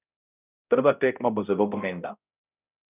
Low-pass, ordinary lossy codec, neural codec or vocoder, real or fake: 3.6 kHz; none; codec, 16 kHz, 0.5 kbps, X-Codec, HuBERT features, trained on balanced general audio; fake